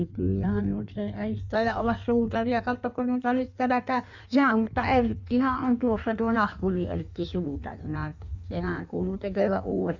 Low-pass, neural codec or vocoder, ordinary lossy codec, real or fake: 7.2 kHz; codec, 16 kHz in and 24 kHz out, 1.1 kbps, FireRedTTS-2 codec; none; fake